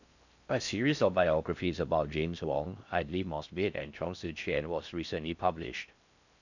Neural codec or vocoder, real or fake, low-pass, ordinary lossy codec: codec, 16 kHz in and 24 kHz out, 0.6 kbps, FocalCodec, streaming, 4096 codes; fake; 7.2 kHz; none